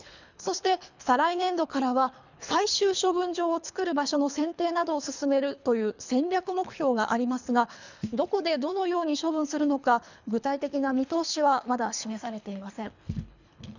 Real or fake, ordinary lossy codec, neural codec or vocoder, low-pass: fake; none; codec, 24 kHz, 3 kbps, HILCodec; 7.2 kHz